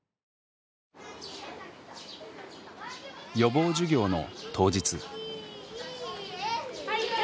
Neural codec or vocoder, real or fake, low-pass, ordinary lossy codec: none; real; none; none